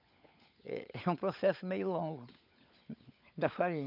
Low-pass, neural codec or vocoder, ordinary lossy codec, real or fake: 5.4 kHz; codec, 16 kHz, 16 kbps, FunCodec, trained on LibriTTS, 50 frames a second; none; fake